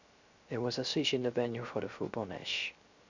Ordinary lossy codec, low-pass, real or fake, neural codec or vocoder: Opus, 64 kbps; 7.2 kHz; fake; codec, 16 kHz, 0.3 kbps, FocalCodec